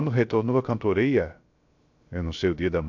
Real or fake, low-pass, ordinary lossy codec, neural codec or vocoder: fake; 7.2 kHz; AAC, 48 kbps; codec, 16 kHz, 0.3 kbps, FocalCodec